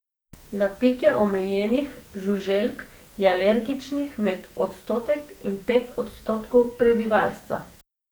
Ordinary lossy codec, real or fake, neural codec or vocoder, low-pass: none; fake; codec, 44.1 kHz, 2.6 kbps, SNAC; none